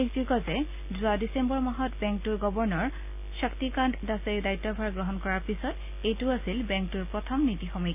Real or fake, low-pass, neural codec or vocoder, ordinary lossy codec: real; 3.6 kHz; none; AAC, 32 kbps